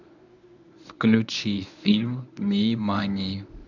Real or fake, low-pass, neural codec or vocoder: fake; 7.2 kHz; codec, 24 kHz, 0.9 kbps, WavTokenizer, medium speech release version 1